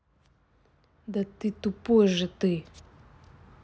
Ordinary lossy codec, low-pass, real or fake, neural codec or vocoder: none; none; real; none